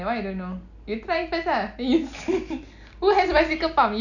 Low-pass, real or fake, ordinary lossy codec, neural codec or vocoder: 7.2 kHz; real; none; none